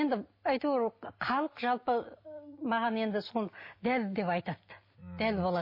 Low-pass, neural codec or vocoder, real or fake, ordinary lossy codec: 5.4 kHz; none; real; MP3, 24 kbps